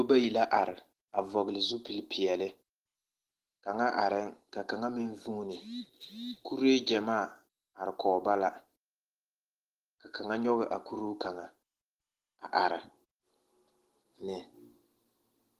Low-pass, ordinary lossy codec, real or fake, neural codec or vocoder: 14.4 kHz; Opus, 16 kbps; real; none